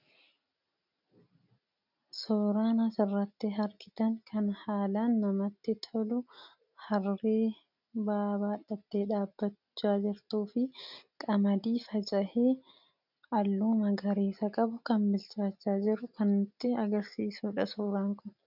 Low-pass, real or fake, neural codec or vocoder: 5.4 kHz; real; none